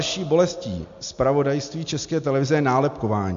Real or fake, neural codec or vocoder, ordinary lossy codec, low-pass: real; none; AAC, 64 kbps; 7.2 kHz